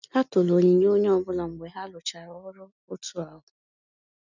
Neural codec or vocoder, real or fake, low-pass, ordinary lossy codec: none; real; 7.2 kHz; none